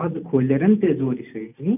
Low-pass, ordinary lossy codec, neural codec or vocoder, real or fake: 3.6 kHz; none; none; real